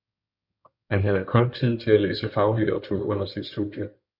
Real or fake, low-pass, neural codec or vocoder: fake; 5.4 kHz; codec, 16 kHz in and 24 kHz out, 2.2 kbps, FireRedTTS-2 codec